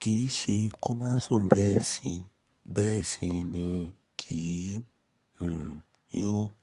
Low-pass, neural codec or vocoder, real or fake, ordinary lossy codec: 10.8 kHz; codec, 24 kHz, 1 kbps, SNAC; fake; Opus, 64 kbps